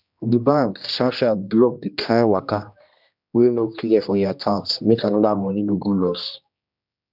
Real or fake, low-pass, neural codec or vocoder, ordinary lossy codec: fake; 5.4 kHz; codec, 16 kHz, 1 kbps, X-Codec, HuBERT features, trained on general audio; none